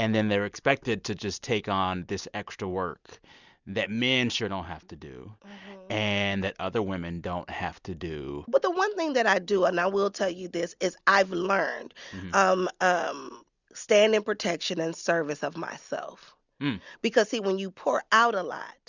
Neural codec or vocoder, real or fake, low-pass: none; real; 7.2 kHz